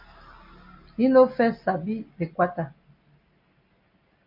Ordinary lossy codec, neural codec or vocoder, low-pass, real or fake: MP3, 48 kbps; none; 5.4 kHz; real